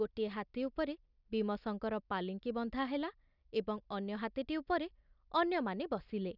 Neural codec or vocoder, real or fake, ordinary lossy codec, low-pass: none; real; none; 5.4 kHz